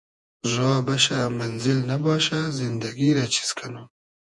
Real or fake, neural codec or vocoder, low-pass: fake; vocoder, 48 kHz, 128 mel bands, Vocos; 10.8 kHz